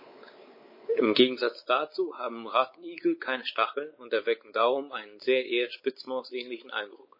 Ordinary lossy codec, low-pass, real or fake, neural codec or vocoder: MP3, 24 kbps; 5.4 kHz; fake; codec, 16 kHz, 4 kbps, X-Codec, WavLM features, trained on Multilingual LibriSpeech